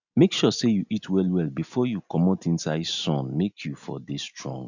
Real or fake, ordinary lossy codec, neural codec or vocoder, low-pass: real; none; none; 7.2 kHz